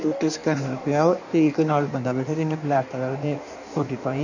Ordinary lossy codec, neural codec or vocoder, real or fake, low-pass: none; codec, 16 kHz in and 24 kHz out, 1.1 kbps, FireRedTTS-2 codec; fake; 7.2 kHz